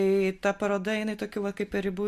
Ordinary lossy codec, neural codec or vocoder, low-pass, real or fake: MP3, 64 kbps; none; 14.4 kHz; real